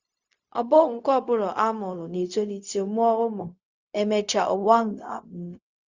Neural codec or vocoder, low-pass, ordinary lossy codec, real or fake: codec, 16 kHz, 0.4 kbps, LongCat-Audio-Codec; 7.2 kHz; Opus, 64 kbps; fake